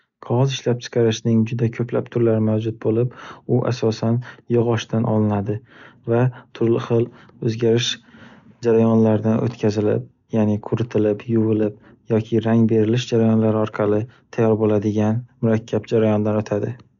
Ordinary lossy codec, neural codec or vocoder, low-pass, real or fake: none; none; 7.2 kHz; real